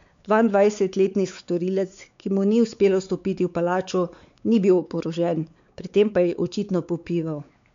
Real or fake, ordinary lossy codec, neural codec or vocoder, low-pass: fake; MP3, 64 kbps; codec, 16 kHz, 4 kbps, X-Codec, WavLM features, trained on Multilingual LibriSpeech; 7.2 kHz